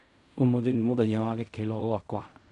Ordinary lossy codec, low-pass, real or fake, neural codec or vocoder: none; 10.8 kHz; fake; codec, 16 kHz in and 24 kHz out, 0.4 kbps, LongCat-Audio-Codec, fine tuned four codebook decoder